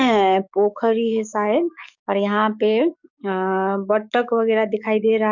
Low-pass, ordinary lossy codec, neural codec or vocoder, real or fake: 7.2 kHz; none; codec, 44.1 kHz, 7.8 kbps, DAC; fake